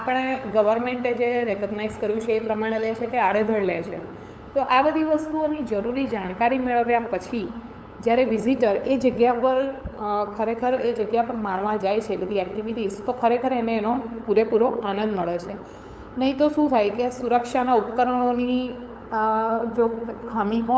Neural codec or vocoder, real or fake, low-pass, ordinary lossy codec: codec, 16 kHz, 8 kbps, FunCodec, trained on LibriTTS, 25 frames a second; fake; none; none